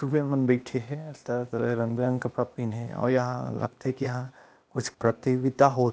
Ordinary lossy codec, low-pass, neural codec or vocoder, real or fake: none; none; codec, 16 kHz, 0.8 kbps, ZipCodec; fake